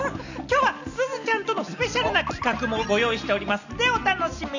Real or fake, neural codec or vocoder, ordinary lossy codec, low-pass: real; none; none; 7.2 kHz